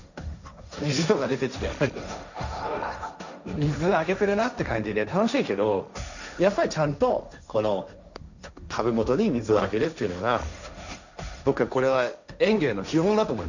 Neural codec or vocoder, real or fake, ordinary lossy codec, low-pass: codec, 16 kHz, 1.1 kbps, Voila-Tokenizer; fake; none; 7.2 kHz